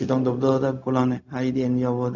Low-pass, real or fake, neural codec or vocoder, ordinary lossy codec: 7.2 kHz; fake; codec, 16 kHz, 0.4 kbps, LongCat-Audio-Codec; none